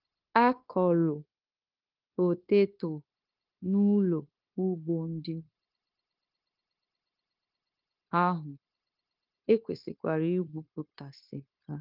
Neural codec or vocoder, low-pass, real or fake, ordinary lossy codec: codec, 16 kHz, 0.9 kbps, LongCat-Audio-Codec; 5.4 kHz; fake; Opus, 32 kbps